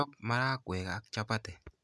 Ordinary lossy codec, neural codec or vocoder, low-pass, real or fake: none; none; none; real